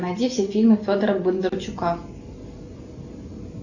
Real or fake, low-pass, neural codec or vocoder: real; 7.2 kHz; none